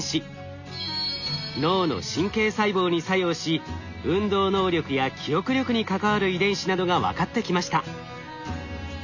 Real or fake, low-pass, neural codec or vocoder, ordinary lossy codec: real; 7.2 kHz; none; none